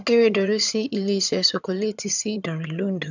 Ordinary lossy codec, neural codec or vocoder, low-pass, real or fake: none; vocoder, 22.05 kHz, 80 mel bands, HiFi-GAN; 7.2 kHz; fake